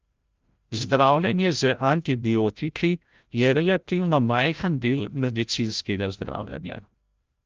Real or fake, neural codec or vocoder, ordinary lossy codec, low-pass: fake; codec, 16 kHz, 0.5 kbps, FreqCodec, larger model; Opus, 24 kbps; 7.2 kHz